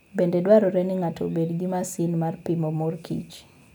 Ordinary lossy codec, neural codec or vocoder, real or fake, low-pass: none; none; real; none